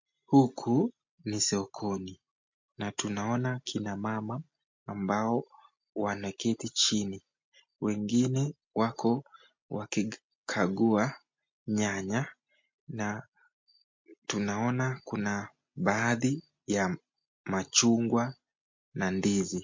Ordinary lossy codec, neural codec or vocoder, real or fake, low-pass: MP3, 48 kbps; none; real; 7.2 kHz